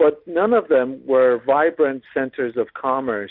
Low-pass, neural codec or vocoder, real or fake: 5.4 kHz; none; real